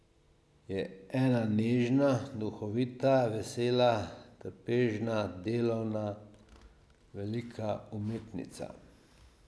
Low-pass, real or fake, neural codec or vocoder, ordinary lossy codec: none; real; none; none